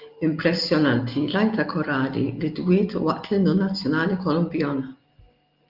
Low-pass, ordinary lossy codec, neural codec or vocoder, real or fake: 5.4 kHz; Opus, 24 kbps; none; real